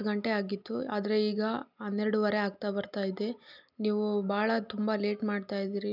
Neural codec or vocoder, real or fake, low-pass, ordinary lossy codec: none; real; 5.4 kHz; none